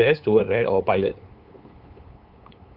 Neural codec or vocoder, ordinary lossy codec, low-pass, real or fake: codec, 16 kHz, 8 kbps, FunCodec, trained on LibriTTS, 25 frames a second; Opus, 32 kbps; 5.4 kHz; fake